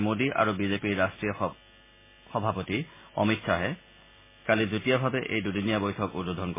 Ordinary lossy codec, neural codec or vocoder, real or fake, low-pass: MP3, 16 kbps; none; real; 3.6 kHz